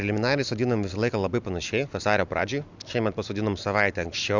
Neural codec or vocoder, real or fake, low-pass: none; real; 7.2 kHz